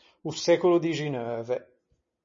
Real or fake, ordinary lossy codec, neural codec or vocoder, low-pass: real; MP3, 32 kbps; none; 7.2 kHz